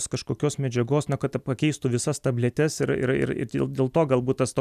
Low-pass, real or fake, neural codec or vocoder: 14.4 kHz; real; none